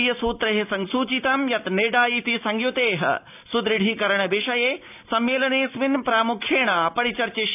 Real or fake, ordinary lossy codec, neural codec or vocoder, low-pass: real; AAC, 32 kbps; none; 3.6 kHz